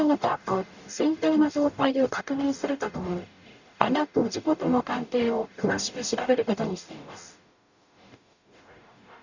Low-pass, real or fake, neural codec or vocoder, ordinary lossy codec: 7.2 kHz; fake; codec, 44.1 kHz, 0.9 kbps, DAC; none